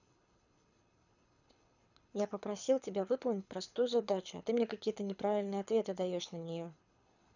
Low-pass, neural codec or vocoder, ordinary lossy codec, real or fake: 7.2 kHz; codec, 24 kHz, 6 kbps, HILCodec; none; fake